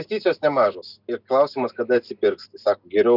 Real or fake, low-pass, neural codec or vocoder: real; 5.4 kHz; none